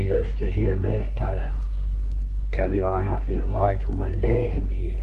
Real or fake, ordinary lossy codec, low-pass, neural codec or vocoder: fake; Opus, 24 kbps; 10.8 kHz; codec, 24 kHz, 1 kbps, SNAC